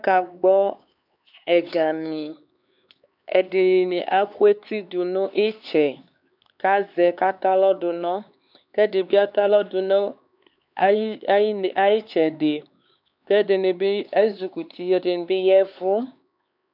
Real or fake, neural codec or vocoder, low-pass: fake; codec, 16 kHz, 4 kbps, X-Codec, HuBERT features, trained on LibriSpeech; 5.4 kHz